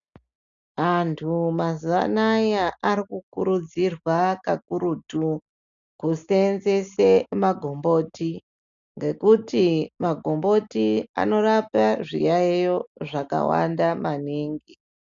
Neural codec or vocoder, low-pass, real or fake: none; 7.2 kHz; real